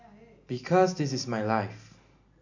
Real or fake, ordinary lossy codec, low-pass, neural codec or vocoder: real; none; 7.2 kHz; none